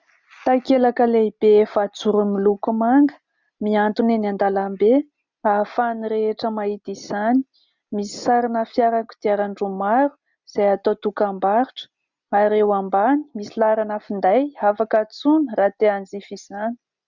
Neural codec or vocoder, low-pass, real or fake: none; 7.2 kHz; real